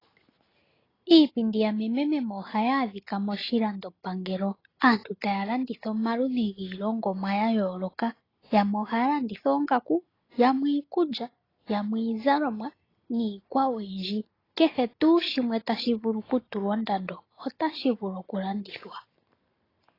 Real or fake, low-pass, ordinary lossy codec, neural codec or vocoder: real; 5.4 kHz; AAC, 24 kbps; none